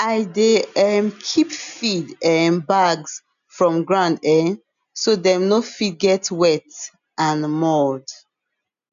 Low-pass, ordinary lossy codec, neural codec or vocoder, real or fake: 7.2 kHz; none; none; real